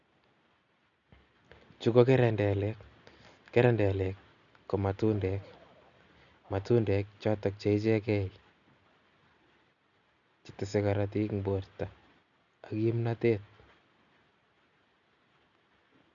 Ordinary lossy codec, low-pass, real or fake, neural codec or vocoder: none; 7.2 kHz; real; none